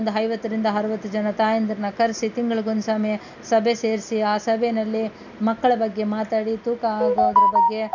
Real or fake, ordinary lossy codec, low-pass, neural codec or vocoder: real; none; 7.2 kHz; none